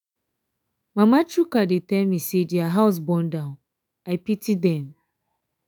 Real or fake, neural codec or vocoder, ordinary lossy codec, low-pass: fake; autoencoder, 48 kHz, 128 numbers a frame, DAC-VAE, trained on Japanese speech; none; none